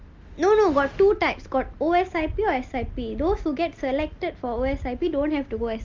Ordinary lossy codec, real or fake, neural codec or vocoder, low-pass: Opus, 32 kbps; real; none; 7.2 kHz